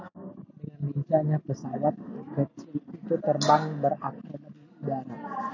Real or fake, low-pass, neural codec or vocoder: real; 7.2 kHz; none